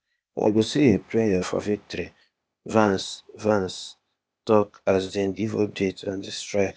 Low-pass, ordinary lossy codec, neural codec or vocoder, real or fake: none; none; codec, 16 kHz, 0.8 kbps, ZipCodec; fake